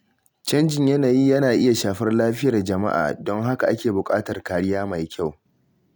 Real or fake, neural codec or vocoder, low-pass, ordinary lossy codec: real; none; none; none